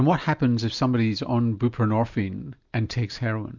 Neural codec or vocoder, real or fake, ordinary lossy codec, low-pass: none; real; Opus, 64 kbps; 7.2 kHz